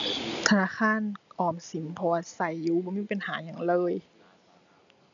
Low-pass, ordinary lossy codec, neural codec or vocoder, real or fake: 7.2 kHz; none; none; real